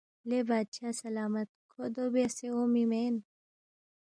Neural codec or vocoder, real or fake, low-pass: none; real; 9.9 kHz